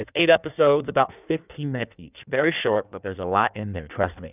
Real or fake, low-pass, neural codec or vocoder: fake; 3.6 kHz; codec, 16 kHz in and 24 kHz out, 1.1 kbps, FireRedTTS-2 codec